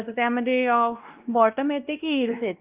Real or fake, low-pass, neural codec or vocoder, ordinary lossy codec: fake; 3.6 kHz; codec, 16 kHz, 1 kbps, X-Codec, WavLM features, trained on Multilingual LibriSpeech; Opus, 24 kbps